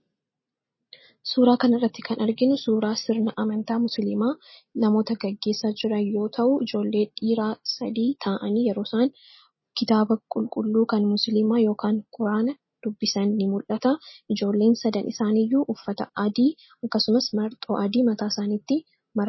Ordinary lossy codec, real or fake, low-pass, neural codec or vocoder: MP3, 24 kbps; real; 7.2 kHz; none